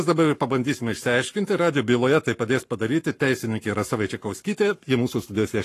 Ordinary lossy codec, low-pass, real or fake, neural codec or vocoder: AAC, 48 kbps; 14.4 kHz; fake; codec, 44.1 kHz, 7.8 kbps, Pupu-Codec